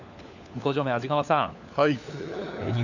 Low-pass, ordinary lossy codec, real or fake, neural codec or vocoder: 7.2 kHz; none; fake; codec, 16 kHz, 4 kbps, FunCodec, trained on LibriTTS, 50 frames a second